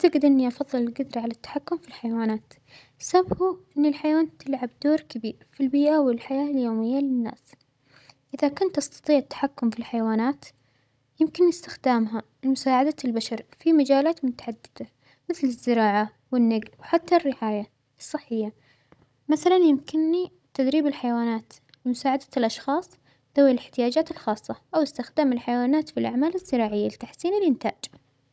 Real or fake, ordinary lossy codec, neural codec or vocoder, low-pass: fake; none; codec, 16 kHz, 16 kbps, FunCodec, trained on Chinese and English, 50 frames a second; none